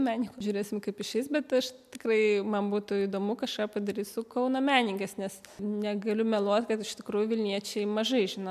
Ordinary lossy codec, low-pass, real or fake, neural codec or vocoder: MP3, 96 kbps; 14.4 kHz; real; none